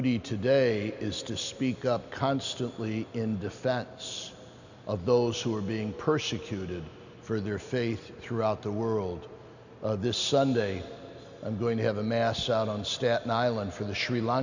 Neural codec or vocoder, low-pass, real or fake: none; 7.2 kHz; real